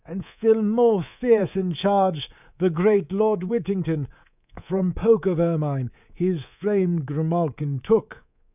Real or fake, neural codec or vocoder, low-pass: fake; codec, 24 kHz, 3.1 kbps, DualCodec; 3.6 kHz